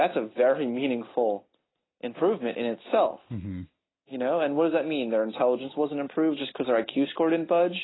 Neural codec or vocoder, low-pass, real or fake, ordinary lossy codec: none; 7.2 kHz; real; AAC, 16 kbps